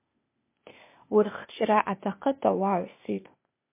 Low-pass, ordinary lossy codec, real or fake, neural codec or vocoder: 3.6 kHz; MP3, 24 kbps; fake; codec, 16 kHz, 0.8 kbps, ZipCodec